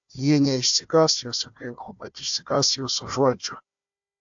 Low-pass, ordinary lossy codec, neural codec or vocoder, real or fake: 7.2 kHz; AAC, 64 kbps; codec, 16 kHz, 1 kbps, FunCodec, trained on Chinese and English, 50 frames a second; fake